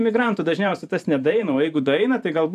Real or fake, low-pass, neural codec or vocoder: fake; 14.4 kHz; vocoder, 44.1 kHz, 128 mel bands every 512 samples, BigVGAN v2